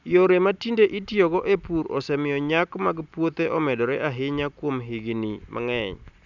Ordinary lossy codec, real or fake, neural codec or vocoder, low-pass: none; real; none; 7.2 kHz